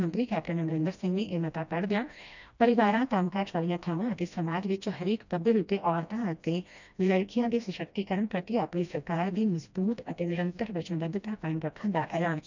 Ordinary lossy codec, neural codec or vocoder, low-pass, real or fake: none; codec, 16 kHz, 1 kbps, FreqCodec, smaller model; 7.2 kHz; fake